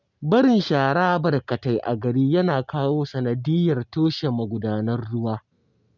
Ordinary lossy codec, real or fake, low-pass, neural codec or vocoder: none; real; 7.2 kHz; none